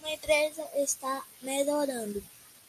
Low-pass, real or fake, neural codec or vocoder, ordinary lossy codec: 14.4 kHz; real; none; MP3, 96 kbps